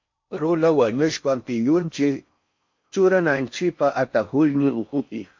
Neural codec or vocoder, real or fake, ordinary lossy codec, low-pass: codec, 16 kHz in and 24 kHz out, 0.6 kbps, FocalCodec, streaming, 4096 codes; fake; MP3, 48 kbps; 7.2 kHz